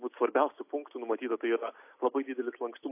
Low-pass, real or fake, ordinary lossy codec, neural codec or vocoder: 3.6 kHz; real; AAC, 24 kbps; none